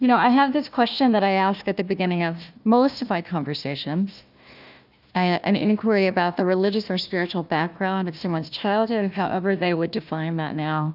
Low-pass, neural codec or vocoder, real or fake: 5.4 kHz; codec, 16 kHz, 1 kbps, FunCodec, trained on Chinese and English, 50 frames a second; fake